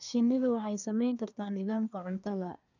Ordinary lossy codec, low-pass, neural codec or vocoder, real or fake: none; 7.2 kHz; codec, 24 kHz, 1 kbps, SNAC; fake